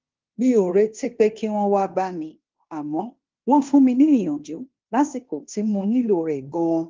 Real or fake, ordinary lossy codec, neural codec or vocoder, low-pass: fake; Opus, 24 kbps; codec, 16 kHz in and 24 kHz out, 0.9 kbps, LongCat-Audio-Codec, fine tuned four codebook decoder; 7.2 kHz